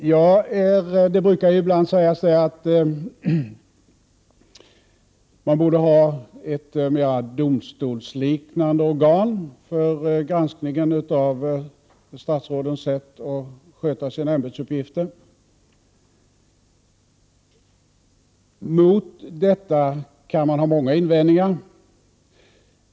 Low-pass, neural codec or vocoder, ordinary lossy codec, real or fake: none; none; none; real